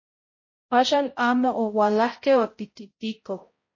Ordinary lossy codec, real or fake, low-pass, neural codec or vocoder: MP3, 32 kbps; fake; 7.2 kHz; codec, 16 kHz, 0.5 kbps, X-Codec, HuBERT features, trained on balanced general audio